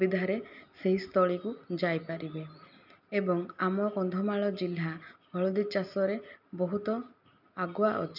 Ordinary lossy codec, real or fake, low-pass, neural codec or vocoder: none; real; 5.4 kHz; none